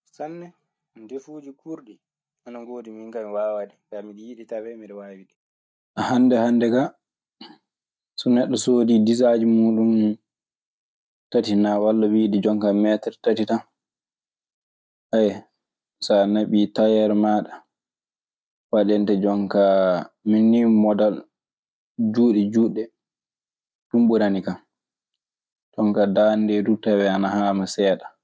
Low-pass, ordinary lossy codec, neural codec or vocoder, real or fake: none; none; none; real